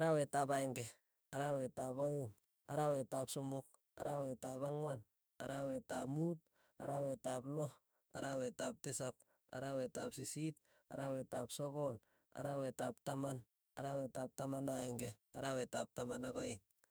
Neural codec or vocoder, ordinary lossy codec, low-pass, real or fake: autoencoder, 48 kHz, 32 numbers a frame, DAC-VAE, trained on Japanese speech; none; none; fake